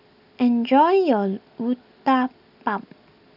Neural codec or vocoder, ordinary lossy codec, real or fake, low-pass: none; none; real; 5.4 kHz